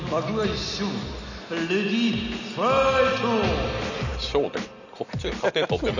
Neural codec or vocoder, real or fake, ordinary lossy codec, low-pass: none; real; none; 7.2 kHz